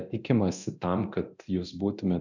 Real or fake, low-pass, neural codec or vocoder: fake; 7.2 kHz; codec, 24 kHz, 0.9 kbps, DualCodec